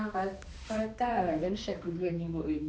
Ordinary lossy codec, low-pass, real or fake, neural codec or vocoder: none; none; fake; codec, 16 kHz, 2 kbps, X-Codec, HuBERT features, trained on balanced general audio